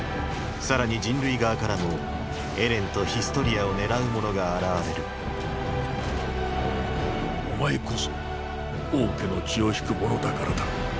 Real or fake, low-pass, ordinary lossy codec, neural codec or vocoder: real; none; none; none